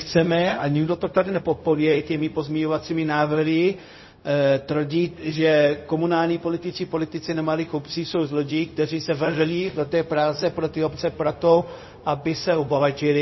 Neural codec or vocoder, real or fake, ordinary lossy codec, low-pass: codec, 16 kHz, 0.4 kbps, LongCat-Audio-Codec; fake; MP3, 24 kbps; 7.2 kHz